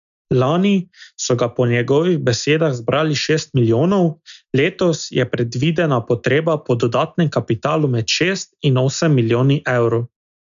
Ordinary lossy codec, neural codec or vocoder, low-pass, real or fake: none; none; 7.2 kHz; real